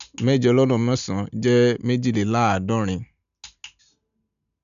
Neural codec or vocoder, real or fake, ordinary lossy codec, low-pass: none; real; none; 7.2 kHz